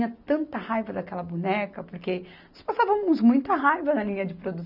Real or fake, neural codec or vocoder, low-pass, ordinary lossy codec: real; none; 5.4 kHz; none